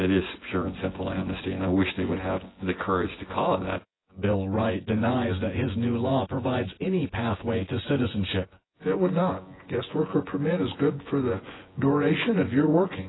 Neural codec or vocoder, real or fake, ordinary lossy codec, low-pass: vocoder, 24 kHz, 100 mel bands, Vocos; fake; AAC, 16 kbps; 7.2 kHz